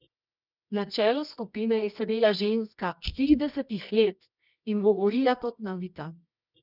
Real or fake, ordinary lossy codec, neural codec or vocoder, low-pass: fake; none; codec, 24 kHz, 0.9 kbps, WavTokenizer, medium music audio release; 5.4 kHz